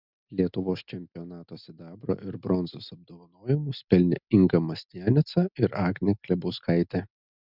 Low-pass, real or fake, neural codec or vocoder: 5.4 kHz; real; none